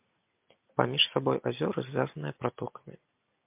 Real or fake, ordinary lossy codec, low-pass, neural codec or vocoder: real; MP3, 32 kbps; 3.6 kHz; none